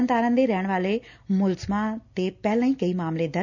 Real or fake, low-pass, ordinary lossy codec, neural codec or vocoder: real; 7.2 kHz; none; none